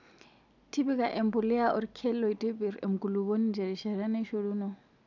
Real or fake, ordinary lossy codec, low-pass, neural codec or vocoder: real; none; 7.2 kHz; none